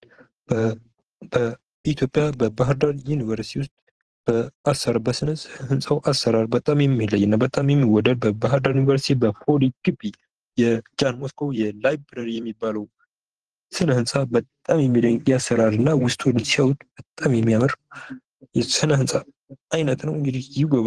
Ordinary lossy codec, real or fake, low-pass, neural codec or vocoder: Opus, 16 kbps; fake; 10.8 kHz; vocoder, 44.1 kHz, 128 mel bands every 512 samples, BigVGAN v2